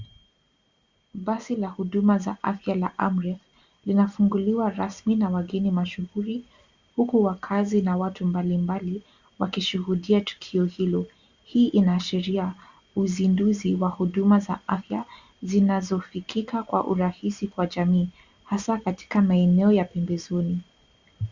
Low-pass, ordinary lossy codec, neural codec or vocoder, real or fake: 7.2 kHz; Opus, 64 kbps; none; real